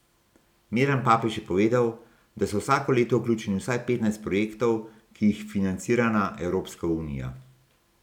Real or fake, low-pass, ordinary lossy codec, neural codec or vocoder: real; 19.8 kHz; none; none